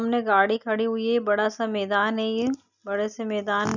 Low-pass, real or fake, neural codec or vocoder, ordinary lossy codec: 7.2 kHz; real; none; none